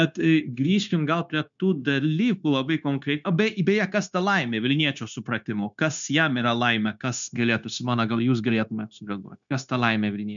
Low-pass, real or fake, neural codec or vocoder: 7.2 kHz; fake; codec, 16 kHz, 0.9 kbps, LongCat-Audio-Codec